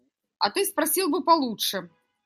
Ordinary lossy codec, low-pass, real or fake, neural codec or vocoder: MP3, 96 kbps; 14.4 kHz; real; none